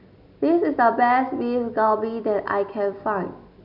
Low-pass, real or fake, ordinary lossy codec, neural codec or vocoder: 5.4 kHz; real; none; none